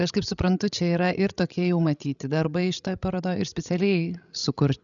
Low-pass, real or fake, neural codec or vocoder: 7.2 kHz; fake; codec, 16 kHz, 16 kbps, FreqCodec, larger model